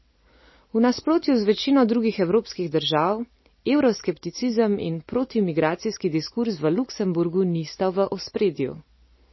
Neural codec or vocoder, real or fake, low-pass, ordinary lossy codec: none; real; 7.2 kHz; MP3, 24 kbps